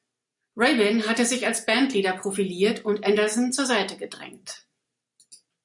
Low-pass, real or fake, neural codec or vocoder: 10.8 kHz; real; none